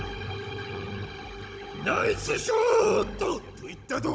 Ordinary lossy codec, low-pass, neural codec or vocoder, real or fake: none; none; codec, 16 kHz, 16 kbps, FunCodec, trained on Chinese and English, 50 frames a second; fake